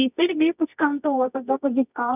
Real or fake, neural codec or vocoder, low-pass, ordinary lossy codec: fake; codec, 24 kHz, 0.9 kbps, WavTokenizer, medium music audio release; 3.6 kHz; none